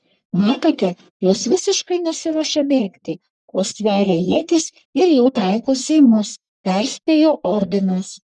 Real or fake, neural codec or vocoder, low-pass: fake; codec, 44.1 kHz, 1.7 kbps, Pupu-Codec; 10.8 kHz